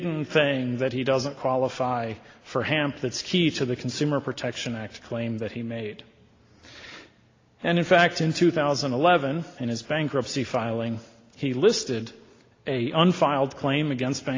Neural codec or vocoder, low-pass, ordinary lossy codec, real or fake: none; 7.2 kHz; AAC, 32 kbps; real